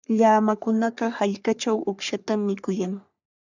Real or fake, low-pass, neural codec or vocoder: fake; 7.2 kHz; codec, 44.1 kHz, 3.4 kbps, Pupu-Codec